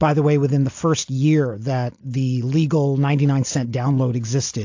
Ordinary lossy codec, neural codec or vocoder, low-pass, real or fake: AAC, 48 kbps; none; 7.2 kHz; real